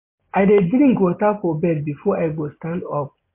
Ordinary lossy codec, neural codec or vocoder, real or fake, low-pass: MP3, 24 kbps; none; real; 3.6 kHz